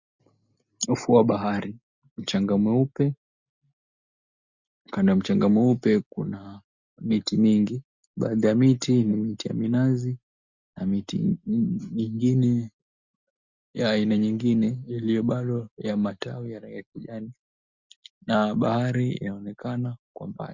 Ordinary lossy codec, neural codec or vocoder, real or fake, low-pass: Opus, 64 kbps; none; real; 7.2 kHz